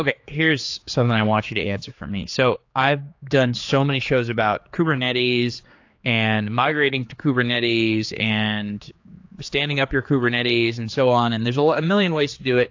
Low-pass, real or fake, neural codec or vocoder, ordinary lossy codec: 7.2 kHz; fake; codec, 16 kHz, 4 kbps, X-Codec, HuBERT features, trained on general audio; AAC, 48 kbps